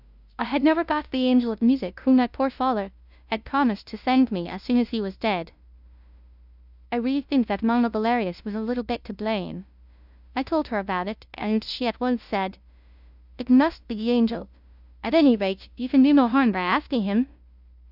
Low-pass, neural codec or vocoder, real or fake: 5.4 kHz; codec, 16 kHz, 0.5 kbps, FunCodec, trained on LibriTTS, 25 frames a second; fake